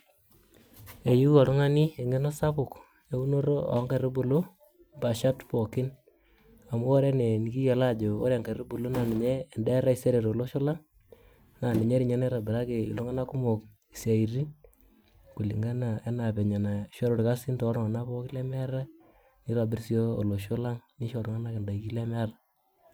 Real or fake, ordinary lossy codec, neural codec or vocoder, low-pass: real; none; none; none